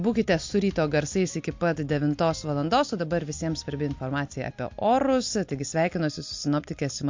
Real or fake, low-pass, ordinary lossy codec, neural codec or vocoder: real; 7.2 kHz; MP3, 48 kbps; none